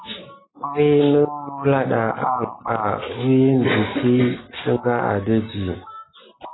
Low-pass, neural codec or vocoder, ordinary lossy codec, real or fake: 7.2 kHz; none; AAC, 16 kbps; real